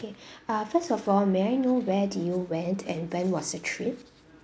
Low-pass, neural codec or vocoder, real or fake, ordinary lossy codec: none; none; real; none